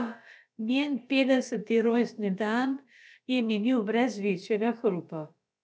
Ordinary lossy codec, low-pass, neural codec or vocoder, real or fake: none; none; codec, 16 kHz, about 1 kbps, DyCAST, with the encoder's durations; fake